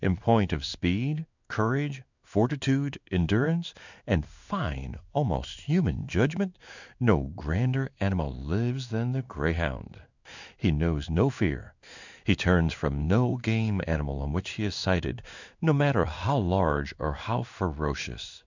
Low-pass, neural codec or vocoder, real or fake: 7.2 kHz; codec, 16 kHz in and 24 kHz out, 1 kbps, XY-Tokenizer; fake